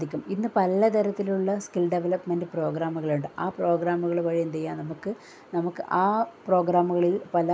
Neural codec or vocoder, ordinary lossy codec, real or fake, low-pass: none; none; real; none